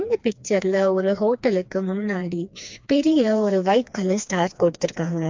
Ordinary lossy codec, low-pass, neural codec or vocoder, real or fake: MP3, 64 kbps; 7.2 kHz; codec, 16 kHz, 2 kbps, FreqCodec, smaller model; fake